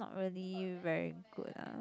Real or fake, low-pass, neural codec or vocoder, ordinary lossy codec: real; none; none; none